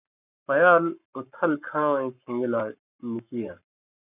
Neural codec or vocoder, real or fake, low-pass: codec, 44.1 kHz, 7.8 kbps, Pupu-Codec; fake; 3.6 kHz